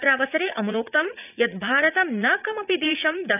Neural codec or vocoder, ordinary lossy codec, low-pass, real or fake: vocoder, 44.1 kHz, 80 mel bands, Vocos; none; 3.6 kHz; fake